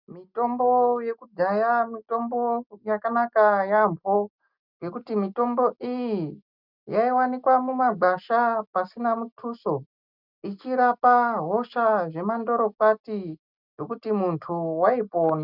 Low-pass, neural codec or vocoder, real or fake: 5.4 kHz; none; real